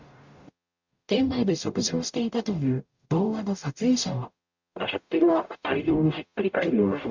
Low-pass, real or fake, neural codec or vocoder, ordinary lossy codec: 7.2 kHz; fake; codec, 44.1 kHz, 0.9 kbps, DAC; Opus, 64 kbps